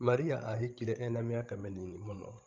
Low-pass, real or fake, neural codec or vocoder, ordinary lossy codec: 7.2 kHz; fake; codec, 16 kHz, 16 kbps, FreqCodec, larger model; Opus, 32 kbps